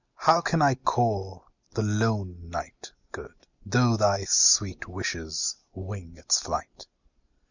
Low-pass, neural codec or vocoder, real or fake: 7.2 kHz; none; real